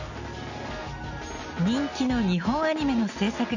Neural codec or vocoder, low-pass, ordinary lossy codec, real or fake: none; 7.2 kHz; none; real